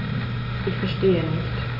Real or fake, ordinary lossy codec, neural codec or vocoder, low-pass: real; none; none; 5.4 kHz